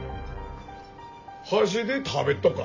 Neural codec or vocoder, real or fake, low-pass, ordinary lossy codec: none; real; 7.2 kHz; MP3, 32 kbps